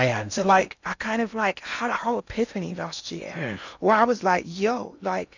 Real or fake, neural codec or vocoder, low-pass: fake; codec, 16 kHz in and 24 kHz out, 0.8 kbps, FocalCodec, streaming, 65536 codes; 7.2 kHz